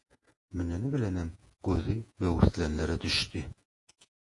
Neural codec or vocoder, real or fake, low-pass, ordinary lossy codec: vocoder, 48 kHz, 128 mel bands, Vocos; fake; 10.8 kHz; AAC, 48 kbps